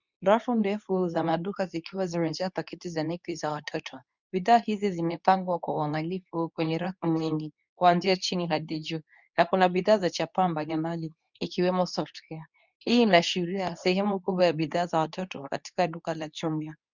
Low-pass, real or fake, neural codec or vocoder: 7.2 kHz; fake; codec, 24 kHz, 0.9 kbps, WavTokenizer, medium speech release version 2